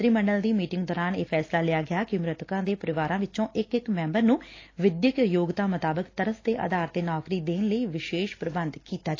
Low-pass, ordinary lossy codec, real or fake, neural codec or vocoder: 7.2 kHz; AAC, 32 kbps; real; none